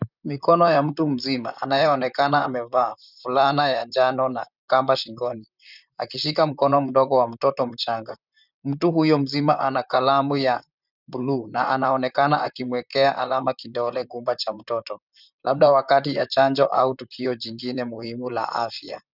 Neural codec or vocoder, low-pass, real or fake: vocoder, 44.1 kHz, 128 mel bands, Pupu-Vocoder; 5.4 kHz; fake